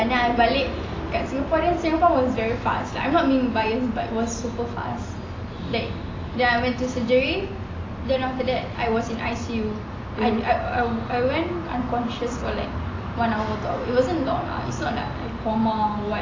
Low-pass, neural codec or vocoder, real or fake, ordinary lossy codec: 7.2 kHz; none; real; AAC, 32 kbps